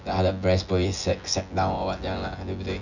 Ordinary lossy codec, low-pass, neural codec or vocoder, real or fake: none; 7.2 kHz; vocoder, 24 kHz, 100 mel bands, Vocos; fake